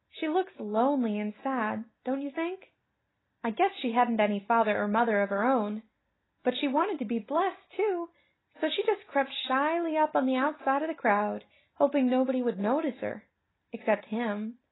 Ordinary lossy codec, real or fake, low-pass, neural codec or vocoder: AAC, 16 kbps; real; 7.2 kHz; none